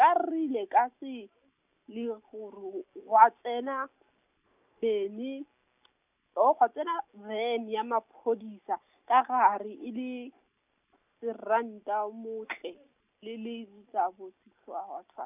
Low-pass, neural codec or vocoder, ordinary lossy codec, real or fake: 3.6 kHz; none; none; real